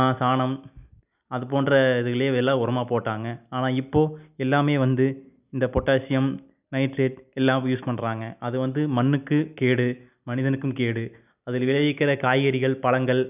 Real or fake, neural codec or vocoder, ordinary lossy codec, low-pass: real; none; none; 3.6 kHz